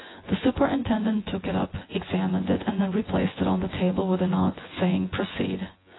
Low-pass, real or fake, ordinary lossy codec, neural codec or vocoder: 7.2 kHz; fake; AAC, 16 kbps; vocoder, 24 kHz, 100 mel bands, Vocos